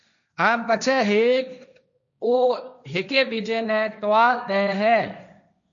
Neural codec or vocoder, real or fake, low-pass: codec, 16 kHz, 1.1 kbps, Voila-Tokenizer; fake; 7.2 kHz